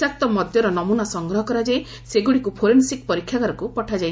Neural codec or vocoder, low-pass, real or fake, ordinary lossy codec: none; none; real; none